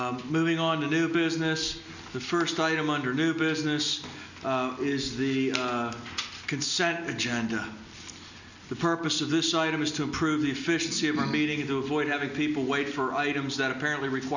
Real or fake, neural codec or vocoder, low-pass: real; none; 7.2 kHz